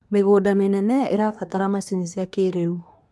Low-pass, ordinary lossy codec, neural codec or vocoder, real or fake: none; none; codec, 24 kHz, 1 kbps, SNAC; fake